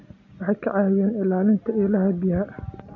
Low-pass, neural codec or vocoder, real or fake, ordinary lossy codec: 7.2 kHz; none; real; none